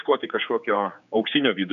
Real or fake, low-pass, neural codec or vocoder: fake; 7.2 kHz; codec, 16 kHz, 4 kbps, X-Codec, HuBERT features, trained on general audio